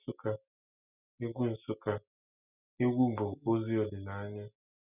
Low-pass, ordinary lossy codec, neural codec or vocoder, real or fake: 3.6 kHz; none; none; real